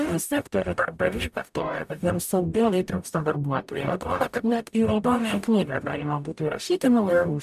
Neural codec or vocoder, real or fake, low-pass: codec, 44.1 kHz, 0.9 kbps, DAC; fake; 14.4 kHz